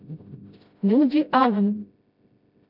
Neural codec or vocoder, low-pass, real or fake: codec, 16 kHz, 0.5 kbps, FreqCodec, smaller model; 5.4 kHz; fake